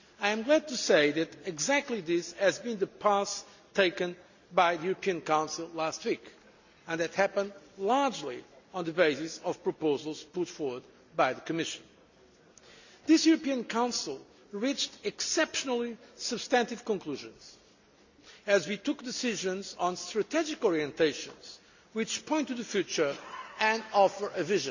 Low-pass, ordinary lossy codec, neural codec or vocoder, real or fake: 7.2 kHz; none; none; real